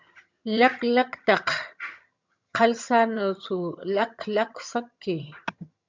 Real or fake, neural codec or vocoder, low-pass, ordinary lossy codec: fake; vocoder, 22.05 kHz, 80 mel bands, HiFi-GAN; 7.2 kHz; MP3, 64 kbps